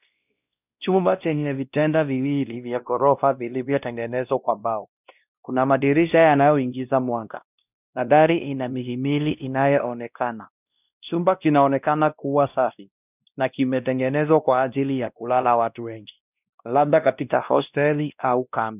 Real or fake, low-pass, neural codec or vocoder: fake; 3.6 kHz; codec, 16 kHz, 1 kbps, X-Codec, WavLM features, trained on Multilingual LibriSpeech